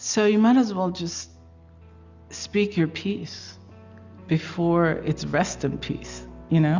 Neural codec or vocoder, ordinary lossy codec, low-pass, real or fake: none; Opus, 64 kbps; 7.2 kHz; real